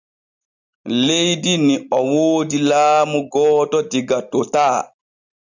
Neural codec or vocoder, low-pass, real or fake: none; 7.2 kHz; real